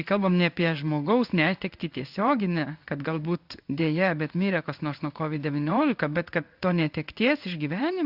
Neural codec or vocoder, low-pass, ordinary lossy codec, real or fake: codec, 16 kHz in and 24 kHz out, 1 kbps, XY-Tokenizer; 5.4 kHz; Opus, 64 kbps; fake